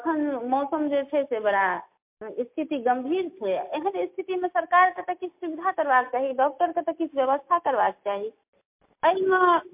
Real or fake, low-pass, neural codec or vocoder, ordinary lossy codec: real; 3.6 kHz; none; MP3, 32 kbps